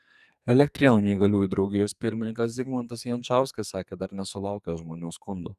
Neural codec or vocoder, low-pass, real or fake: codec, 44.1 kHz, 2.6 kbps, SNAC; 14.4 kHz; fake